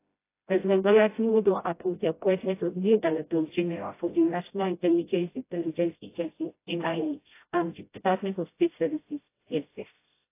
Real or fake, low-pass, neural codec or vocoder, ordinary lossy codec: fake; 3.6 kHz; codec, 16 kHz, 0.5 kbps, FreqCodec, smaller model; AAC, 24 kbps